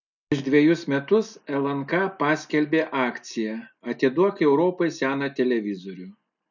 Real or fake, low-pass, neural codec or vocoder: real; 7.2 kHz; none